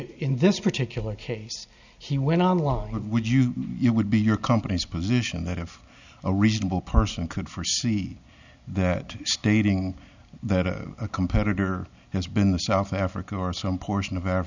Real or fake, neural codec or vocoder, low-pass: real; none; 7.2 kHz